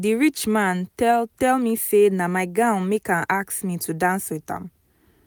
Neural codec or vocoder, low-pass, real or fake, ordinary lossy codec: none; none; real; none